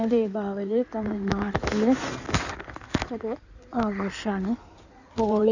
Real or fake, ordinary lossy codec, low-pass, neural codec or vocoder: fake; none; 7.2 kHz; codec, 16 kHz in and 24 kHz out, 2.2 kbps, FireRedTTS-2 codec